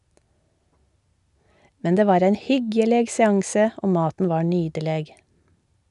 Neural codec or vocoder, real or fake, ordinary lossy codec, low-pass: none; real; none; 10.8 kHz